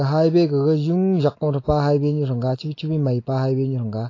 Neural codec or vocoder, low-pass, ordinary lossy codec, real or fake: none; 7.2 kHz; AAC, 32 kbps; real